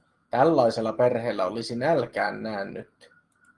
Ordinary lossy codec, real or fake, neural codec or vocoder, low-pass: Opus, 24 kbps; real; none; 10.8 kHz